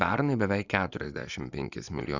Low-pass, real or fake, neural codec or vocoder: 7.2 kHz; fake; vocoder, 24 kHz, 100 mel bands, Vocos